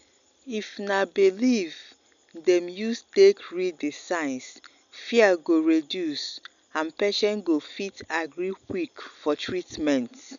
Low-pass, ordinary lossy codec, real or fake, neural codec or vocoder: 7.2 kHz; none; real; none